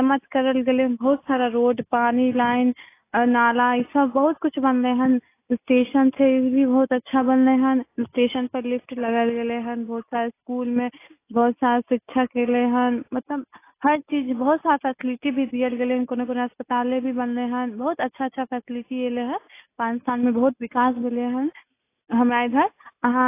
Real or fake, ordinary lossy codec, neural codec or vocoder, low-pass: real; AAC, 24 kbps; none; 3.6 kHz